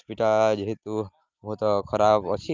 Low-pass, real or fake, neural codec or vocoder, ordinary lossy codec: 7.2 kHz; real; none; Opus, 24 kbps